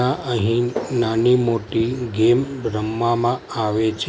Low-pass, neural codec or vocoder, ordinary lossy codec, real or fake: none; none; none; real